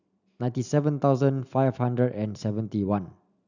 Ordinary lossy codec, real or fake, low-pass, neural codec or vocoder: none; real; 7.2 kHz; none